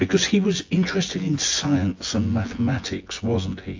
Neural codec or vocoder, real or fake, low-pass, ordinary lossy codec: vocoder, 24 kHz, 100 mel bands, Vocos; fake; 7.2 kHz; AAC, 48 kbps